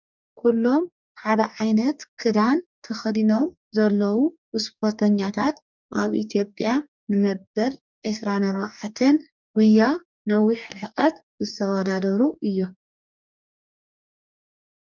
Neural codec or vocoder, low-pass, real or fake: codec, 44.1 kHz, 2.6 kbps, DAC; 7.2 kHz; fake